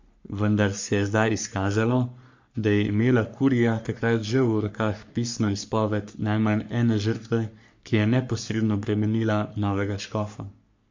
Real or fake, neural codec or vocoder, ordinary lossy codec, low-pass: fake; codec, 44.1 kHz, 3.4 kbps, Pupu-Codec; MP3, 48 kbps; 7.2 kHz